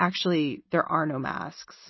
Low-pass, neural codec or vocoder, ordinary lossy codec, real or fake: 7.2 kHz; none; MP3, 24 kbps; real